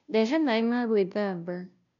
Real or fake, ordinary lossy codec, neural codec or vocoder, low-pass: fake; none; codec, 16 kHz, 0.5 kbps, FunCodec, trained on Chinese and English, 25 frames a second; 7.2 kHz